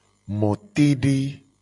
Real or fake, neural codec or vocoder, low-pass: real; none; 10.8 kHz